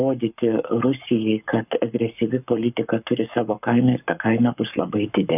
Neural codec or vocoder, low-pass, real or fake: codec, 44.1 kHz, 7.8 kbps, Pupu-Codec; 3.6 kHz; fake